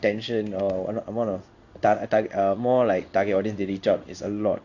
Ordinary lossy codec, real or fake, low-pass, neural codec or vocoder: none; fake; 7.2 kHz; codec, 16 kHz in and 24 kHz out, 1 kbps, XY-Tokenizer